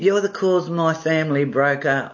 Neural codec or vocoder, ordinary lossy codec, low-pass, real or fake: none; MP3, 32 kbps; 7.2 kHz; real